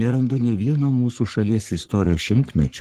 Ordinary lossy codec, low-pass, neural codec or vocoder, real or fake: Opus, 16 kbps; 14.4 kHz; codec, 44.1 kHz, 3.4 kbps, Pupu-Codec; fake